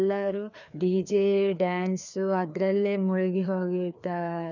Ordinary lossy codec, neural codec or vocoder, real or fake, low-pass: none; codec, 16 kHz, 2 kbps, FreqCodec, larger model; fake; 7.2 kHz